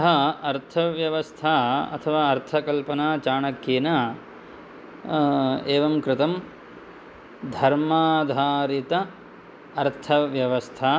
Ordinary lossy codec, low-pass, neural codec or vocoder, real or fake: none; none; none; real